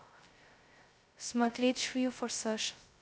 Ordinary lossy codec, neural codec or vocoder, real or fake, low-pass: none; codec, 16 kHz, 0.2 kbps, FocalCodec; fake; none